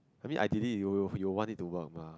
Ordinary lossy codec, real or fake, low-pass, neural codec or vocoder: none; real; none; none